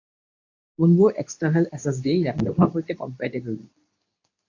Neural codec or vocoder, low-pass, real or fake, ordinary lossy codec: codec, 24 kHz, 0.9 kbps, WavTokenizer, medium speech release version 2; 7.2 kHz; fake; AAC, 48 kbps